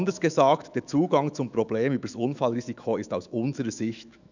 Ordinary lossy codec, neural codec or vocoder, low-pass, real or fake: none; autoencoder, 48 kHz, 128 numbers a frame, DAC-VAE, trained on Japanese speech; 7.2 kHz; fake